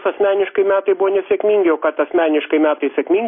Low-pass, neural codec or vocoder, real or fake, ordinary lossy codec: 5.4 kHz; none; real; MP3, 24 kbps